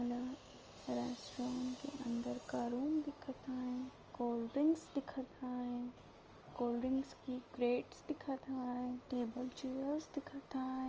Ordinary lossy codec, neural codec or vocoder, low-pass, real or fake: Opus, 24 kbps; none; 7.2 kHz; real